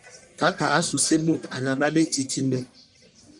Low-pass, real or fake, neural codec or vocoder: 10.8 kHz; fake; codec, 44.1 kHz, 1.7 kbps, Pupu-Codec